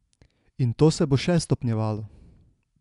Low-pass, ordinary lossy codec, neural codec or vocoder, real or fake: 10.8 kHz; none; none; real